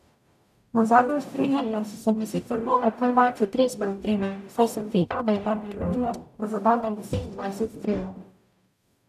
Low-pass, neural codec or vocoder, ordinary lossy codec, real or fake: 14.4 kHz; codec, 44.1 kHz, 0.9 kbps, DAC; none; fake